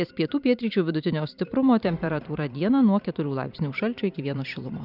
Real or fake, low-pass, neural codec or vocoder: fake; 5.4 kHz; vocoder, 44.1 kHz, 128 mel bands every 512 samples, BigVGAN v2